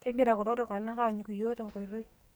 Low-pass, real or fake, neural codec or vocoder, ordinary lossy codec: none; fake; codec, 44.1 kHz, 2.6 kbps, SNAC; none